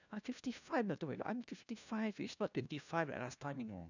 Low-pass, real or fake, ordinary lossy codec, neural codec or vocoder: 7.2 kHz; fake; none; codec, 16 kHz, 1 kbps, FunCodec, trained on LibriTTS, 50 frames a second